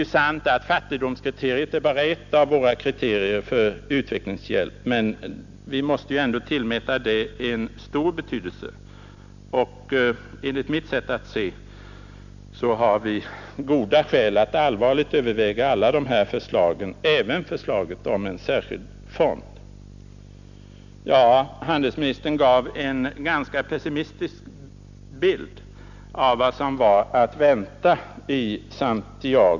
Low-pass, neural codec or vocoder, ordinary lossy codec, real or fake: 7.2 kHz; none; none; real